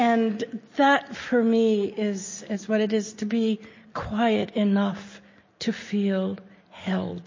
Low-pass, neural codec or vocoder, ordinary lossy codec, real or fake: 7.2 kHz; none; MP3, 32 kbps; real